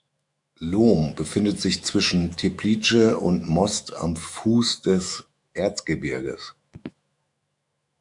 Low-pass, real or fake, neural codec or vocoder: 10.8 kHz; fake; autoencoder, 48 kHz, 128 numbers a frame, DAC-VAE, trained on Japanese speech